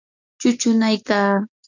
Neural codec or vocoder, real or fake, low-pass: none; real; 7.2 kHz